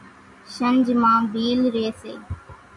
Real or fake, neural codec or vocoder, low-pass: real; none; 10.8 kHz